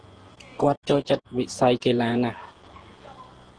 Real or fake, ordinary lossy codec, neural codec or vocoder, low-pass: fake; Opus, 16 kbps; vocoder, 48 kHz, 128 mel bands, Vocos; 9.9 kHz